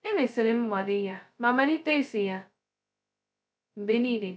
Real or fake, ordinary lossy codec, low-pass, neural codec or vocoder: fake; none; none; codec, 16 kHz, 0.2 kbps, FocalCodec